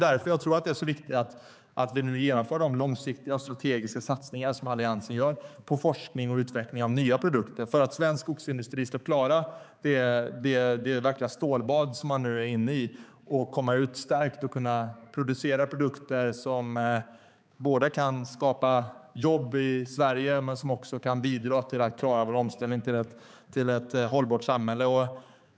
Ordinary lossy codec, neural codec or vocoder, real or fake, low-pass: none; codec, 16 kHz, 4 kbps, X-Codec, HuBERT features, trained on balanced general audio; fake; none